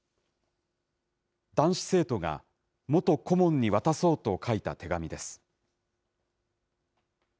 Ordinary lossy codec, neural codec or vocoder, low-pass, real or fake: none; none; none; real